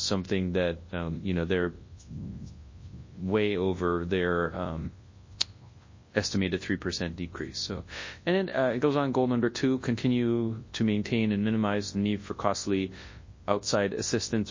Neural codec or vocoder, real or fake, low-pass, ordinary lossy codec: codec, 24 kHz, 0.9 kbps, WavTokenizer, large speech release; fake; 7.2 kHz; MP3, 32 kbps